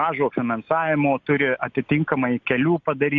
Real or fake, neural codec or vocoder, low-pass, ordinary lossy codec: real; none; 7.2 kHz; MP3, 48 kbps